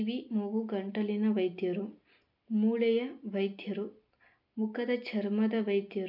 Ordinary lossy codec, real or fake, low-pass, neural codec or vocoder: none; real; 5.4 kHz; none